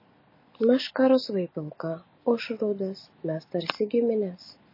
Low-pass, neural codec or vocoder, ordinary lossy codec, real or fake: 5.4 kHz; none; MP3, 24 kbps; real